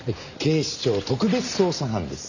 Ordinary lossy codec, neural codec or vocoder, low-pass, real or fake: none; none; 7.2 kHz; real